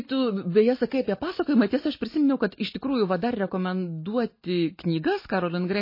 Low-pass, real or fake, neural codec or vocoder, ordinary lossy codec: 5.4 kHz; real; none; MP3, 24 kbps